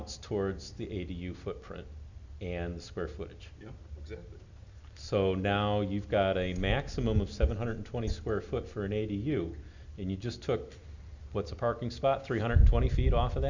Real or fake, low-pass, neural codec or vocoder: real; 7.2 kHz; none